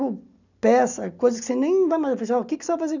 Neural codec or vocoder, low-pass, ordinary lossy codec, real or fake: none; 7.2 kHz; none; real